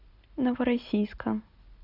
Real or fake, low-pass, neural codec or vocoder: real; 5.4 kHz; none